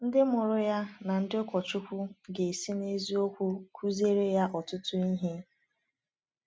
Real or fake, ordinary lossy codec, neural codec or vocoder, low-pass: real; none; none; none